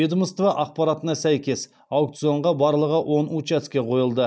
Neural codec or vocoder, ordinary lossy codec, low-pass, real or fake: none; none; none; real